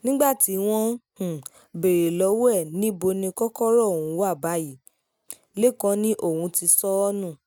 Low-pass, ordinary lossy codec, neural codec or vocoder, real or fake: none; none; none; real